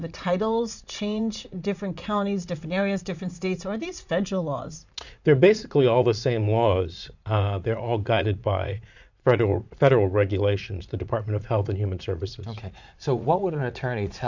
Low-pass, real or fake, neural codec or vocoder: 7.2 kHz; fake; vocoder, 44.1 kHz, 80 mel bands, Vocos